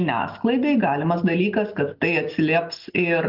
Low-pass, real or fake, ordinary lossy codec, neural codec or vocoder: 5.4 kHz; real; Opus, 32 kbps; none